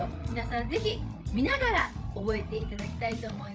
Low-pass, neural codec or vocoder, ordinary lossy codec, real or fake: none; codec, 16 kHz, 16 kbps, FreqCodec, larger model; none; fake